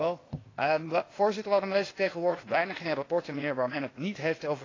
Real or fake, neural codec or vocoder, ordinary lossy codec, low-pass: fake; codec, 16 kHz, 0.8 kbps, ZipCodec; AAC, 32 kbps; 7.2 kHz